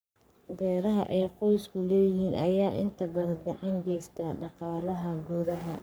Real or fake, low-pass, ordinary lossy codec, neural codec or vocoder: fake; none; none; codec, 44.1 kHz, 3.4 kbps, Pupu-Codec